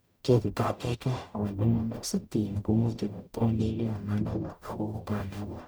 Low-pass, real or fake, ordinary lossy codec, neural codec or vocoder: none; fake; none; codec, 44.1 kHz, 0.9 kbps, DAC